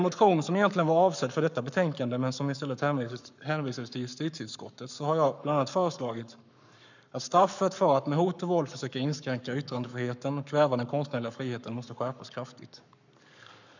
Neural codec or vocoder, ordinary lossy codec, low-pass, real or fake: codec, 44.1 kHz, 7.8 kbps, Pupu-Codec; none; 7.2 kHz; fake